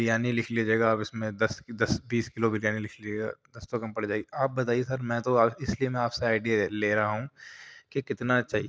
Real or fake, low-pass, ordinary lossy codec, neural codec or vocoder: real; none; none; none